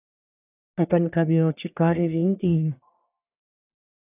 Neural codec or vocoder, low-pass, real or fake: codec, 44.1 kHz, 1.7 kbps, Pupu-Codec; 3.6 kHz; fake